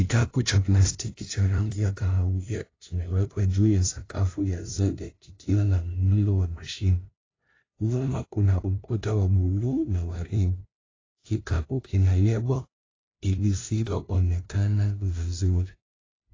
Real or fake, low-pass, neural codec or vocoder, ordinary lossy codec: fake; 7.2 kHz; codec, 16 kHz, 0.5 kbps, FunCodec, trained on LibriTTS, 25 frames a second; AAC, 32 kbps